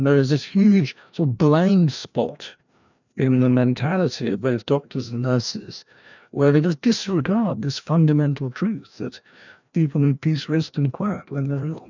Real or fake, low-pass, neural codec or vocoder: fake; 7.2 kHz; codec, 16 kHz, 1 kbps, FreqCodec, larger model